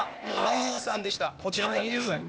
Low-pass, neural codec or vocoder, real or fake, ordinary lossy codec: none; codec, 16 kHz, 0.8 kbps, ZipCodec; fake; none